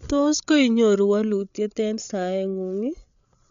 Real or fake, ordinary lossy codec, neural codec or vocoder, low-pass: fake; none; codec, 16 kHz, 8 kbps, FreqCodec, larger model; 7.2 kHz